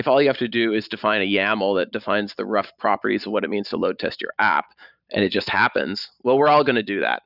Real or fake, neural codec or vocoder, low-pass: fake; vocoder, 44.1 kHz, 128 mel bands every 512 samples, BigVGAN v2; 5.4 kHz